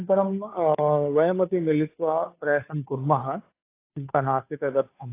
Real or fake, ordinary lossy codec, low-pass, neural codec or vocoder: fake; AAC, 24 kbps; 3.6 kHz; codec, 16 kHz, 2 kbps, FunCodec, trained on Chinese and English, 25 frames a second